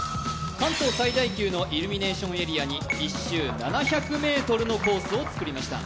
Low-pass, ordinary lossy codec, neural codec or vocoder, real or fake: none; none; none; real